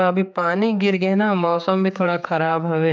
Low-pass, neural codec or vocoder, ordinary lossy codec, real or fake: none; codec, 16 kHz, 4 kbps, X-Codec, HuBERT features, trained on general audio; none; fake